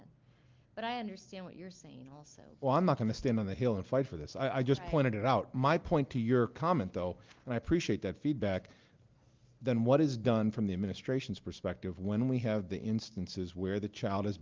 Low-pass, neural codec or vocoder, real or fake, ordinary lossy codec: 7.2 kHz; none; real; Opus, 32 kbps